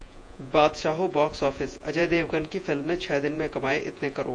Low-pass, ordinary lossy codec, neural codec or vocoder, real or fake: 9.9 kHz; AAC, 48 kbps; vocoder, 48 kHz, 128 mel bands, Vocos; fake